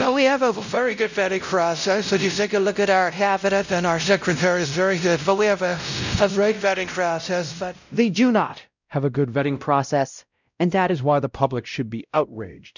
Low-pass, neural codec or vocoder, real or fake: 7.2 kHz; codec, 16 kHz, 0.5 kbps, X-Codec, WavLM features, trained on Multilingual LibriSpeech; fake